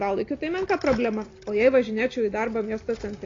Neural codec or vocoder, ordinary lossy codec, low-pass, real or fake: none; AAC, 32 kbps; 7.2 kHz; real